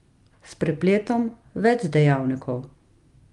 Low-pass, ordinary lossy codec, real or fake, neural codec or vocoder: 10.8 kHz; Opus, 32 kbps; real; none